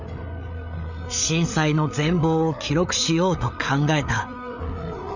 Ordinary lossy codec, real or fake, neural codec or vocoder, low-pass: none; fake; codec, 16 kHz, 8 kbps, FreqCodec, larger model; 7.2 kHz